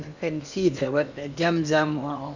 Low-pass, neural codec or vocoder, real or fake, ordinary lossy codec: 7.2 kHz; codec, 16 kHz in and 24 kHz out, 0.8 kbps, FocalCodec, streaming, 65536 codes; fake; none